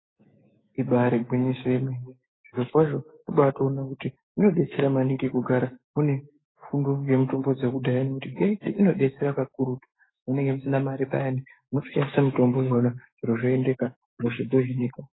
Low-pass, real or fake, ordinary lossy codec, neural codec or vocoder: 7.2 kHz; fake; AAC, 16 kbps; autoencoder, 48 kHz, 128 numbers a frame, DAC-VAE, trained on Japanese speech